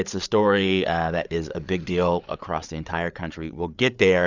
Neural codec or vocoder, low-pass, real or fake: codec, 16 kHz, 8 kbps, FreqCodec, larger model; 7.2 kHz; fake